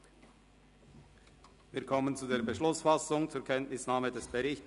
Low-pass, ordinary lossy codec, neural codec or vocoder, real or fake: 10.8 kHz; none; none; real